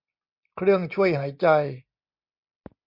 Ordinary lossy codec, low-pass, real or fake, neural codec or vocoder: MP3, 48 kbps; 5.4 kHz; real; none